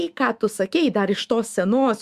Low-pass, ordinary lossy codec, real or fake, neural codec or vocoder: 14.4 kHz; Opus, 64 kbps; fake; autoencoder, 48 kHz, 128 numbers a frame, DAC-VAE, trained on Japanese speech